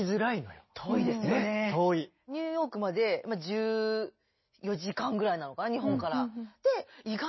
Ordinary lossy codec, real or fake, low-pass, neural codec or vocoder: MP3, 24 kbps; real; 7.2 kHz; none